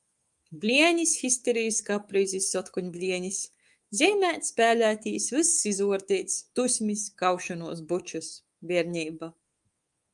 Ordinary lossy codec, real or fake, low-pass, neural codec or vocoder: Opus, 32 kbps; fake; 10.8 kHz; codec, 24 kHz, 3.1 kbps, DualCodec